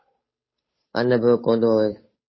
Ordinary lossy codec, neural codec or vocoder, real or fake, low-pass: MP3, 24 kbps; codec, 16 kHz, 8 kbps, FunCodec, trained on Chinese and English, 25 frames a second; fake; 7.2 kHz